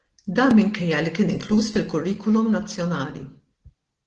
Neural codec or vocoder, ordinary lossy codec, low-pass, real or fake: none; Opus, 16 kbps; 9.9 kHz; real